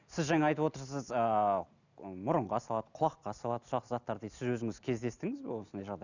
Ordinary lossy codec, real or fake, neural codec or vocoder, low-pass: none; real; none; 7.2 kHz